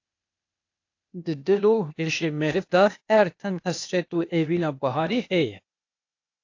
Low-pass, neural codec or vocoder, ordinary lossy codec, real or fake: 7.2 kHz; codec, 16 kHz, 0.8 kbps, ZipCodec; AAC, 48 kbps; fake